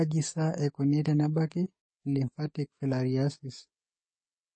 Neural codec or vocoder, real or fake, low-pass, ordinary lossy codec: codec, 44.1 kHz, 7.8 kbps, DAC; fake; 9.9 kHz; MP3, 32 kbps